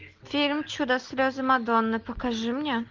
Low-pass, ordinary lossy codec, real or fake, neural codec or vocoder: 7.2 kHz; Opus, 24 kbps; real; none